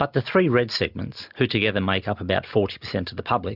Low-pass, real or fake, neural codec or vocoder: 5.4 kHz; real; none